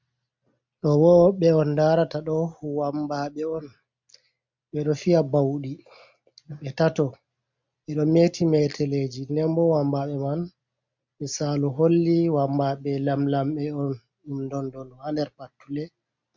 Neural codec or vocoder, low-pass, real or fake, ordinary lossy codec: none; 7.2 kHz; real; MP3, 64 kbps